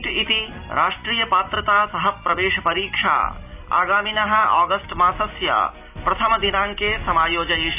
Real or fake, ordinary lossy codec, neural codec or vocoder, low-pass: real; none; none; 3.6 kHz